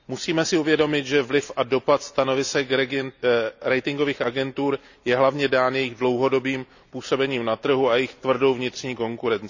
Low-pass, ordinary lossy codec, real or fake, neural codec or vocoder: 7.2 kHz; none; real; none